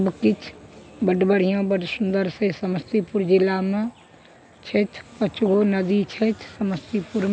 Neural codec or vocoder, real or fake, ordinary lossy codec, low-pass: none; real; none; none